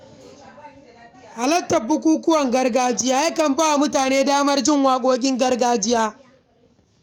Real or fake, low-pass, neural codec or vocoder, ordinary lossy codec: fake; 19.8 kHz; codec, 44.1 kHz, 7.8 kbps, DAC; none